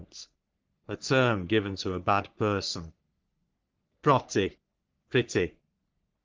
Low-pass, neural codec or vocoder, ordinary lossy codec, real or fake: 7.2 kHz; codec, 44.1 kHz, 3.4 kbps, Pupu-Codec; Opus, 32 kbps; fake